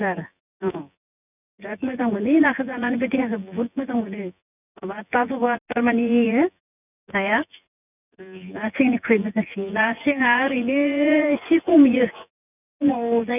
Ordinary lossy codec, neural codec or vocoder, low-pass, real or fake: none; vocoder, 24 kHz, 100 mel bands, Vocos; 3.6 kHz; fake